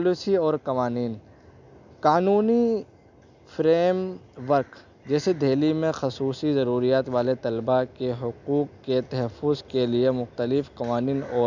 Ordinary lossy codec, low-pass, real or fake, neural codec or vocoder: none; 7.2 kHz; real; none